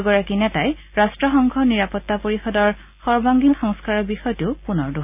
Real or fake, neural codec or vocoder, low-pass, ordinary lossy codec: real; none; 3.6 kHz; none